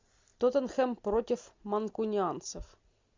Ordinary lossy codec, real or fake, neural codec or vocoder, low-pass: MP3, 64 kbps; real; none; 7.2 kHz